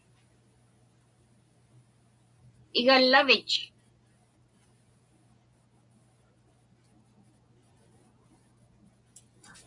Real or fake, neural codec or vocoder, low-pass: real; none; 10.8 kHz